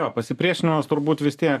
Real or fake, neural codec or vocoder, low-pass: real; none; 14.4 kHz